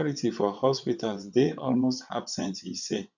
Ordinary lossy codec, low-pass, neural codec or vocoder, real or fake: none; 7.2 kHz; vocoder, 22.05 kHz, 80 mel bands, WaveNeXt; fake